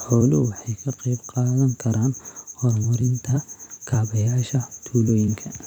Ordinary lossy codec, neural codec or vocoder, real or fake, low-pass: none; vocoder, 44.1 kHz, 128 mel bands every 256 samples, BigVGAN v2; fake; 19.8 kHz